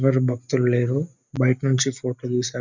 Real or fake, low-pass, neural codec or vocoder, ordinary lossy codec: real; 7.2 kHz; none; none